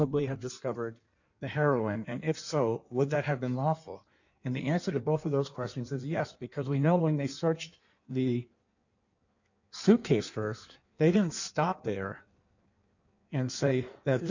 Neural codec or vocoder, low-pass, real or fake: codec, 16 kHz in and 24 kHz out, 1.1 kbps, FireRedTTS-2 codec; 7.2 kHz; fake